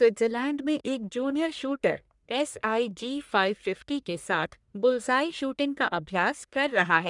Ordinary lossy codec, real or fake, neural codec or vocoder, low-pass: none; fake; codec, 44.1 kHz, 1.7 kbps, Pupu-Codec; 10.8 kHz